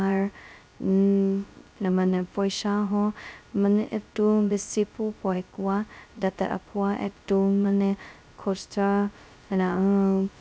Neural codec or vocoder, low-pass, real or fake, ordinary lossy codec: codec, 16 kHz, 0.2 kbps, FocalCodec; none; fake; none